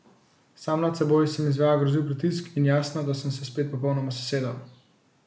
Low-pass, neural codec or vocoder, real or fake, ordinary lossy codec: none; none; real; none